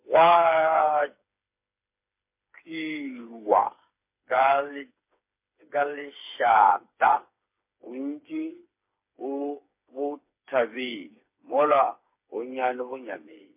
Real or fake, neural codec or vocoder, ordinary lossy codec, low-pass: fake; codec, 16 kHz, 4 kbps, FreqCodec, smaller model; MP3, 24 kbps; 3.6 kHz